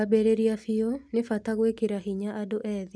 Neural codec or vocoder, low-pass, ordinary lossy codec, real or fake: none; none; none; real